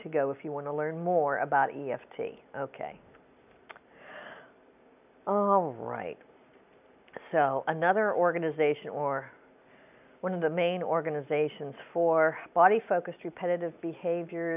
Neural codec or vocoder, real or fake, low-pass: none; real; 3.6 kHz